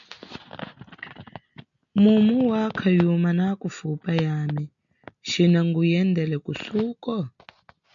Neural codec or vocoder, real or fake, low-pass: none; real; 7.2 kHz